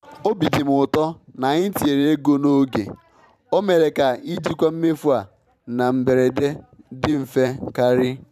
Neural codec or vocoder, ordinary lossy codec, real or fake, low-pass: vocoder, 44.1 kHz, 128 mel bands every 512 samples, BigVGAN v2; none; fake; 14.4 kHz